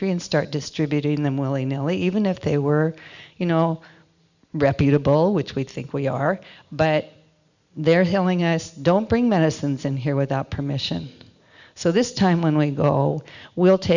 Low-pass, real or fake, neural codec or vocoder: 7.2 kHz; real; none